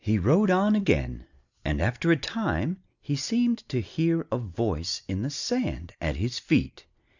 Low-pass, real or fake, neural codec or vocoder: 7.2 kHz; real; none